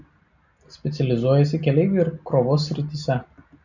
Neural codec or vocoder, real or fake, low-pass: none; real; 7.2 kHz